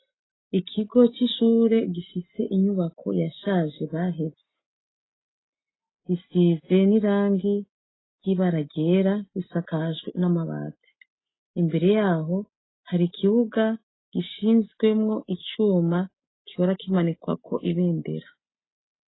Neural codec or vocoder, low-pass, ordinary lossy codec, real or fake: none; 7.2 kHz; AAC, 16 kbps; real